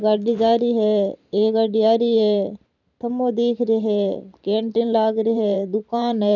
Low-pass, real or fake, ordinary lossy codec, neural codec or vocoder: 7.2 kHz; fake; none; vocoder, 44.1 kHz, 128 mel bands every 512 samples, BigVGAN v2